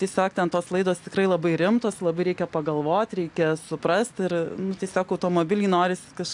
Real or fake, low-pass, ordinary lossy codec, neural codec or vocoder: real; 10.8 kHz; AAC, 64 kbps; none